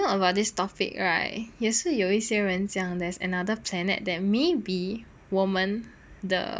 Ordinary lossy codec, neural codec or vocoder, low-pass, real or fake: none; none; none; real